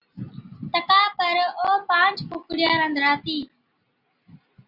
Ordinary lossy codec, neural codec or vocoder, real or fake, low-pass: Opus, 64 kbps; none; real; 5.4 kHz